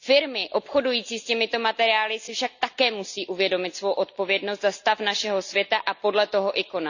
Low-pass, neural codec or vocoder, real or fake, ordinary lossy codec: 7.2 kHz; none; real; none